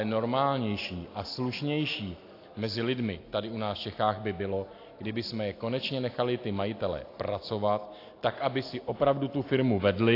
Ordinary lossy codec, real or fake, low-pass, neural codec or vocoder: AAC, 32 kbps; real; 5.4 kHz; none